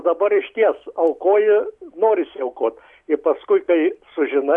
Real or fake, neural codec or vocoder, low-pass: real; none; 10.8 kHz